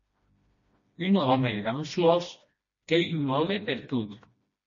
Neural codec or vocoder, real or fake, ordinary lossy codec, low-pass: codec, 16 kHz, 1 kbps, FreqCodec, smaller model; fake; MP3, 32 kbps; 7.2 kHz